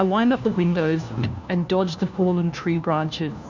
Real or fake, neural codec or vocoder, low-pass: fake; codec, 16 kHz, 1 kbps, FunCodec, trained on LibriTTS, 50 frames a second; 7.2 kHz